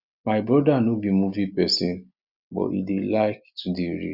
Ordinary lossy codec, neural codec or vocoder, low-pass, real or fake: Opus, 64 kbps; none; 5.4 kHz; real